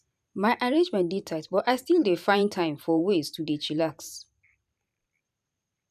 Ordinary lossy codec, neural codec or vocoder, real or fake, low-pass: none; vocoder, 44.1 kHz, 128 mel bands every 512 samples, BigVGAN v2; fake; 14.4 kHz